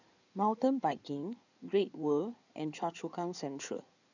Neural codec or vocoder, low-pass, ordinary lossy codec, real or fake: codec, 16 kHz in and 24 kHz out, 2.2 kbps, FireRedTTS-2 codec; 7.2 kHz; none; fake